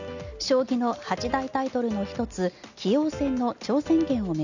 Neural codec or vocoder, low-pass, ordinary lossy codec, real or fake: none; 7.2 kHz; none; real